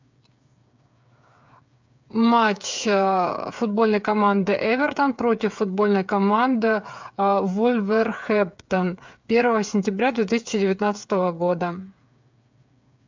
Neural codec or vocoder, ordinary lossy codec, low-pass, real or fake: codec, 16 kHz, 8 kbps, FreqCodec, smaller model; MP3, 64 kbps; 7.2 kHz; fake